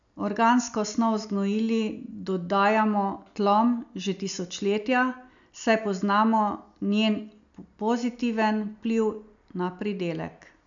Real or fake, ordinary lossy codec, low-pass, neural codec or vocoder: real; none; 7.2 kHz; none